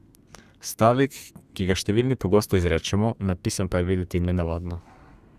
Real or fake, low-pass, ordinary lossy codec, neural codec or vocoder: fake; 14.4 kHz; Opus, 64 kbps; codec, 32 kHz, 1.9 kbps, SNAC